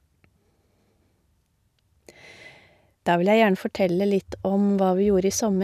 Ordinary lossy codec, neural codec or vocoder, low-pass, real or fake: none; none; 14.4 kHz; real